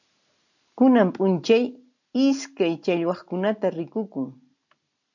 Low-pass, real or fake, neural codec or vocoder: 7.2 kHz; real; none